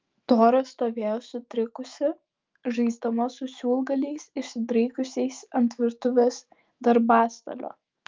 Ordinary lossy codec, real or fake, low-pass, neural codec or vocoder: Opus, 24 kbps; fake; 7.2 kHz; autoencoder, 48 kHz, 128 numbers a frame, DAC-VAE, trained on Japanese speech